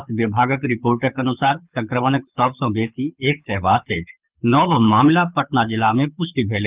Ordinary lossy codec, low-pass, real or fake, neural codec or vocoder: Opus, 24 kbps; 3.6 kHz; fake; codec, 24 kHz, 6 kbps, HILCodec